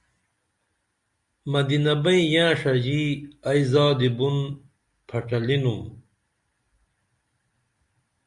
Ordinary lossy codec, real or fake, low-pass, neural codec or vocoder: Opus, 64 kbps; real; 10.8 kHz; none